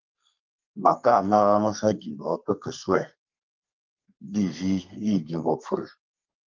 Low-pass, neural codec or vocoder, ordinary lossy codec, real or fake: 7.2 kHz; codec, 32 kHz, 1.9 kbps, SNAC; Opus, 24 kbps; fake